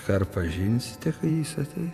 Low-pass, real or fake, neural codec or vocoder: 14.4 kHz; fake; vocoder, 48 kHz, 128 mel bands, Vocos